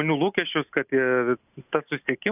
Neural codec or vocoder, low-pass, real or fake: none; 3.6 kHz; real